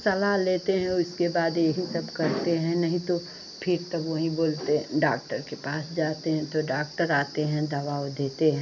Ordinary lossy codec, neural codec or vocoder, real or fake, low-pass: none; none; real; 7.2 kHz